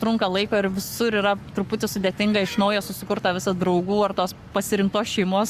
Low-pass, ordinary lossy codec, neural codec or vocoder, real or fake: 14.4 kHz; Opus, 64 kbps; codec, 44.1 kHz, 7.8 kbps, Pupu-Codec; fake